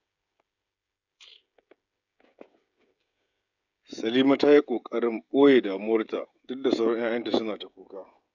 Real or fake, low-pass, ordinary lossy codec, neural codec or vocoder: fake; 7.2 kHz; none; codec, 16 kHz, 16 kbps, FreqCodec, smaller model